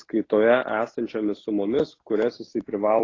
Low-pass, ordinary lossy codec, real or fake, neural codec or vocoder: 7.2 kHz; AAC, 32 kbps; real; none